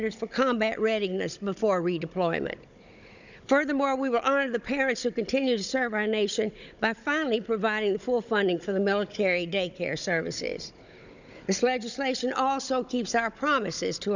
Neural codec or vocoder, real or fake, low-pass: codec, 16 kHz, 16 kbps, FunCodec, trained on Chinese and English, 50 frames a second; fake; 7.2 kHz